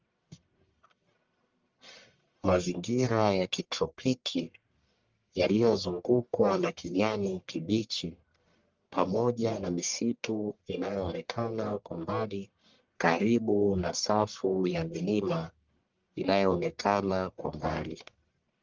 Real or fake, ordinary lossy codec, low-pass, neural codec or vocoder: fake; Opus, 32 kbps; 7.2 kHz; codec, 44.1 kHz, 1.7 kbps, Pupu-Codec